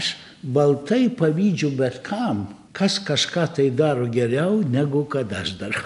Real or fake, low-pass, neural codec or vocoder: real; 10.8 kHz; none